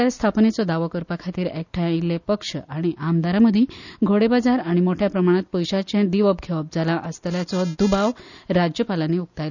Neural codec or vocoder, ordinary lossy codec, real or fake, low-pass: none; none; real; 7.2 kHz